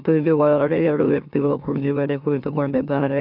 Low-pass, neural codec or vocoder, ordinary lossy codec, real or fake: 5.4 kHz; autoencoder, 44.1 kHz, a latent of 192 numbers a frame, MeloTTS; none; fake